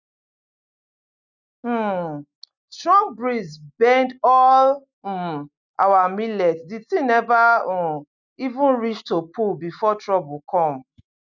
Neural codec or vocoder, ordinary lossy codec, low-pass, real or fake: none; none; 7.2 kHz; real